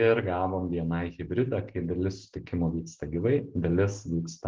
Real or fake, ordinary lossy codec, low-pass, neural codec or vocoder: real; Opus, 16 kbps; 7.2 kHz; none